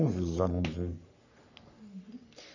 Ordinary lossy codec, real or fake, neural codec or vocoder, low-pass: none; fake; codec, 44.1 kHz, 3.4 kbps, Pupu-Codec; 7.2 kHz